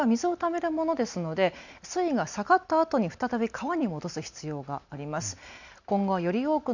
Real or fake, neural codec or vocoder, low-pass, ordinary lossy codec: real; none; 7.2 kHz; none